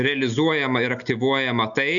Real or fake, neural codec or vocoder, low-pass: real; none; 7.2 kHz